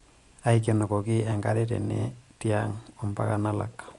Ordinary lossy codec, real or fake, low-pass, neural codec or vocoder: Opus, 64 kbps; fake; 10.8 kHz; vocoder, 24 kHz, 100 mel bands, Vocos